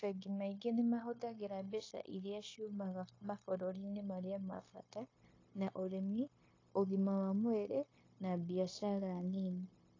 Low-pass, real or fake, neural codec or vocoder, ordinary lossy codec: 7.2 kHz; fake; codec, 16 kHz, 0.9 kbps, LongCat-Audio-Codec; AAC, 32 kbps